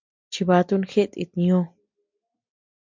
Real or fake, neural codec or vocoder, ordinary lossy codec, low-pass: real; none; MP3, 64 kbps; 7.2 kHz